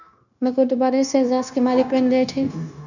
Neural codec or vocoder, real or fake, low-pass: codec, 16 kHz, 0.9 kbps, LongCat-Audio-Codec; fake; 7.2 kHz